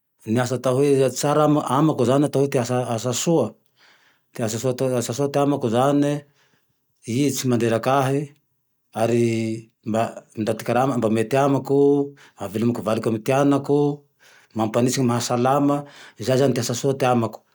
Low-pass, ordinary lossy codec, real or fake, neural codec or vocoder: none; none; real; none